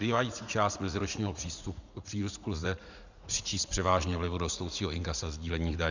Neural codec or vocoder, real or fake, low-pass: vocoder, 44.1 kHz, 80 mel bands, Vocos; fake; 7.2 kHz